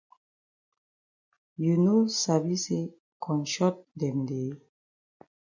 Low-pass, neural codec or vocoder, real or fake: 7.2 kHz; none; real